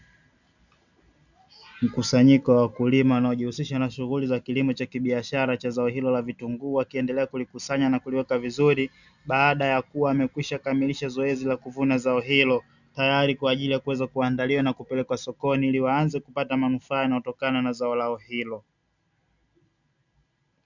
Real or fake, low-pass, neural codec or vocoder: real; 7.2 kHz; none